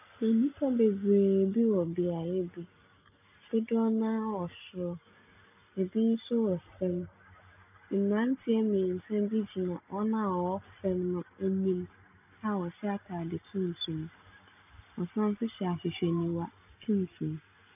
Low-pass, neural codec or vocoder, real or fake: 3.6 kHz; none; real